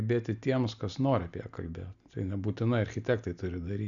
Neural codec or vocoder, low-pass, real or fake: none; 7.2 kHz; real